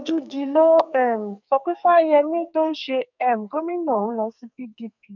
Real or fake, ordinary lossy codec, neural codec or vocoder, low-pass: fake; none; codec, 44.1 kHz, 2.6 kbps, SNAC; 7.2 kHz